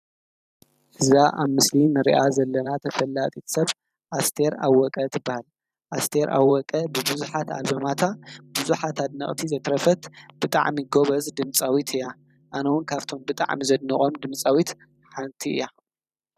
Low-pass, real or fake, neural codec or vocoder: 14.4 kHz; fake; vocoder, 44.1 kHz, 128 mel bands every 512 samples, BigVGAN v2